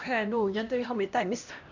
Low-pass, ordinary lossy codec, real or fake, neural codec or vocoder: 7.2 kHz; none; fake; codec, 16 kHz, 1 kbps, X-Codec, HuBERT features, trained on LibriSpeech